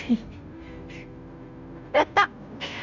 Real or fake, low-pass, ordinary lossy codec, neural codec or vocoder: fake; 7.2 kHz; none; codec, 16 kHz, 0.5 kbps, FunCodec, trained on Chinese and English, 25 frames a second